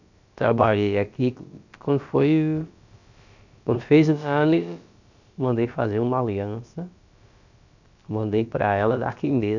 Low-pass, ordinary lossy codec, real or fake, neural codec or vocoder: 7.2 kHz; none; fake; codec, 16 kHz, about 1 kbps, DyCAST, with the encoder's durations